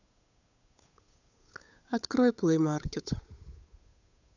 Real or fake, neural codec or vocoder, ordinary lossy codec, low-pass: fake; codec, 16 kHz, 8 kbps, FunCodec, trained on Chinese and English, 25 frames a second; none; 7.2 kHz